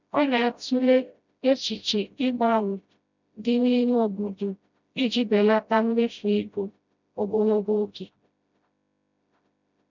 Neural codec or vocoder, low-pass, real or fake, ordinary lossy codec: codec, 16 kHz, 0.5 kbps, FreqCodec, smaller model; 7.2 kHz; fake; none